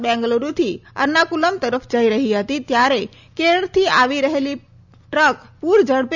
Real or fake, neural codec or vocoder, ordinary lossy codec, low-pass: real; none; none; 7.2 kHz